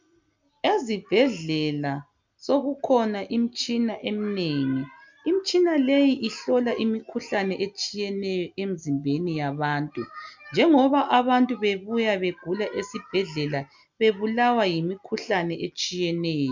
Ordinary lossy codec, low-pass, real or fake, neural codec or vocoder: MP3, 64 kbps; 7.2 kHz; real; none